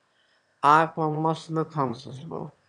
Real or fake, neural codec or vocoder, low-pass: fake; autoencoder, 22.05 kHz, a latent of 192 numbers a frame, VITS, trained on one speaker; 9.9 kHz